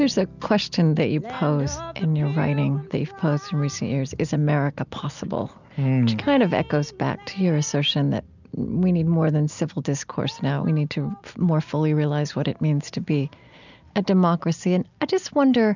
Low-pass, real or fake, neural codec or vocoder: 7.2 kHz; real; none